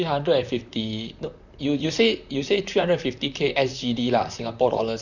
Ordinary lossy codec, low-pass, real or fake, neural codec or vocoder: none; 7.2 kHz; fake; vocoder, 44.1 kHz, 128 mel bands, Pupu-Vocoder